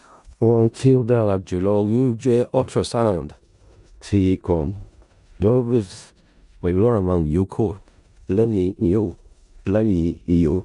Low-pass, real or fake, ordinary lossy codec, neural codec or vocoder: 10.8 kHz; fake; none; codec, 16 kHz in and 24 kHz out, 0.4 kbps, LongCat-Audio-Codec, four codebook decoder